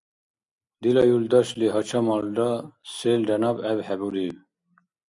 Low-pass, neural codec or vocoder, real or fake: 10.8 kHz; none; real